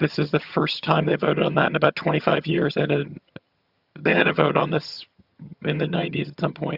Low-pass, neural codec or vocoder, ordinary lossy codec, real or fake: 5.4 kHz; vocoder, 22.05 kHz, 80 mel bands, HiFi-GAN; Opus, 64 kbps; fake